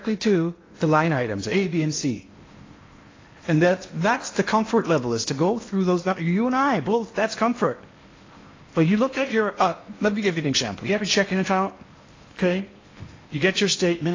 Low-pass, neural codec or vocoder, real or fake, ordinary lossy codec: 7.2 kHz; codec, 16 kHz in and 24 kHz out, 0.8 kbps, FocalCodec, streaming, 65536 codes; fake; AAC, 32 kbps